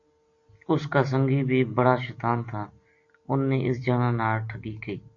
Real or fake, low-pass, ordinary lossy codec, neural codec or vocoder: real; 7.2 kHz; AAC, 48 kbps; none